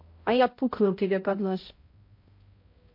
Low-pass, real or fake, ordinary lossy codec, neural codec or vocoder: 5.4 kHz; fake; MP3, 32 kbps; codec, 16 kHz, 0.5 kbps, X-Codec, HuBERT features, trained on balanced general audio